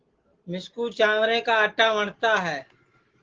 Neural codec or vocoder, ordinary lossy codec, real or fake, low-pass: none; Opus, 16 kbps; real; 7.2 kHz